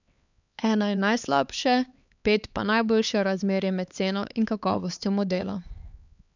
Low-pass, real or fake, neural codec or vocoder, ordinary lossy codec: 7.2 kHz; fake; codec, 16 kHz, 4 kbps, X-Codec, HuBERT features, trained on LibriSpeech; none